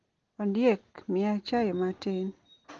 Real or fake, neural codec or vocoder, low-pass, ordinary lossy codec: real; none; 7.2 kHz; Opus, 16 kbps